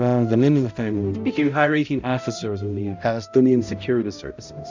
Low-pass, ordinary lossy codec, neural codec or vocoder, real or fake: 7.2 kHz; MP3, 64 kbps; codec, 16 kHz, 0.5 kbps, X-Codec, HuBERT features, trained on balanced general audio; fake